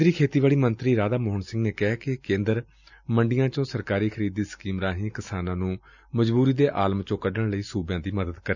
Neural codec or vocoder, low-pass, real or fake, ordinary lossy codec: none; 7.2 kHz; real; none